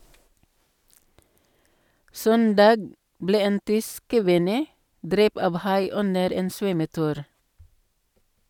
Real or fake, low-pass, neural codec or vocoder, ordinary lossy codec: real; 19.8 kHz; none; none